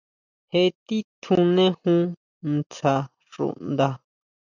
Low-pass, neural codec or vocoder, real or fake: 7.2 kHz; none; real